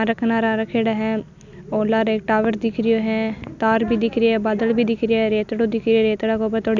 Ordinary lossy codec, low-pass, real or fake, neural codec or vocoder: none; 7.2 kHz; real; none